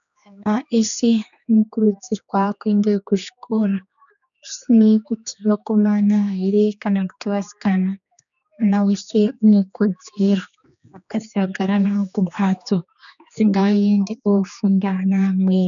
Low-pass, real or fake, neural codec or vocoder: 7.2 kHz; fake; codec, 16 kHz, 2 kbps, X-Codec, HuBERT features, trained on general audio